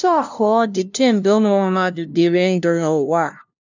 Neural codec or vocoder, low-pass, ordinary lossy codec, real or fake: codec, 16 kHz, 0.5 kbps, FunCodec, trained on LibriTTS, 25 frames a second; 7.2 kHz; none; fake